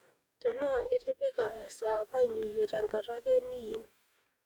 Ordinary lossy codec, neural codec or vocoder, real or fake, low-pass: none; codec, 44.1 kHz, 2.6 kbps, DAC; fake; 19.8 kHz